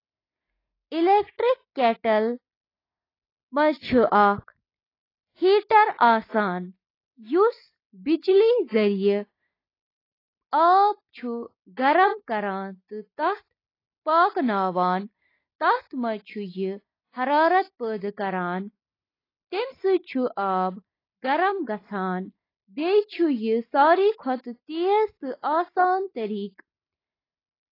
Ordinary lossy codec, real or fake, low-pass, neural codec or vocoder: AAC, 24 kbps; real; 5.4 kHz; none